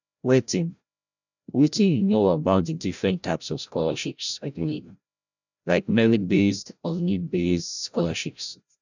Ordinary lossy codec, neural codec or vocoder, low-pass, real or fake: none; codec, 16 kHz, 0.5 kbps, FreqCodec, larger model; 7.2 kHz; fake